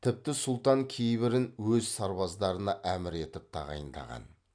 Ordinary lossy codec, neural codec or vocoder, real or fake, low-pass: AAC, 64 kbps; none; real; 9.9 kHz